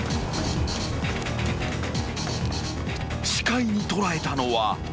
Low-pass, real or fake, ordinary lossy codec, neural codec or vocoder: none; real; none; none